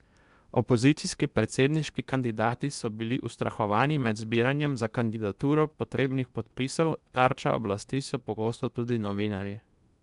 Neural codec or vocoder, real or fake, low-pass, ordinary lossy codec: codec, 16 kHz in and 24 kHz out, 0.8 kbps, FocalCodec, streaming, 65536 codes; fake; 10.8 kHz; none